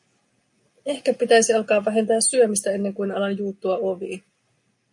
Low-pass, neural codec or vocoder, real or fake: 10.8 kHz; none; real